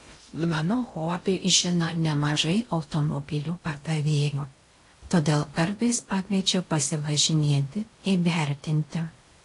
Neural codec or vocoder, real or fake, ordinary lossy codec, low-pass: codec, 16 kHz in and 24 kHz out, 0.6 kbps, FocalCodec, streaming, 2048 codes; fake; AAC, 48 kbps; 10.8 kHz